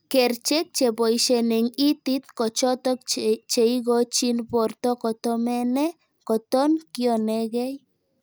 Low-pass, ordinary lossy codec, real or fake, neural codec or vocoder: none; none; real; none